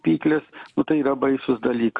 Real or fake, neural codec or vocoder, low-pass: real; none; 10.8 kHz